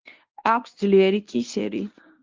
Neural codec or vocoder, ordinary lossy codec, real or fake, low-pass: codec, 24 kHz, 0.9 kbps, WavTokenizer, medium speech release version 1; Opus, 24 kbps; fake; 7.2 kHz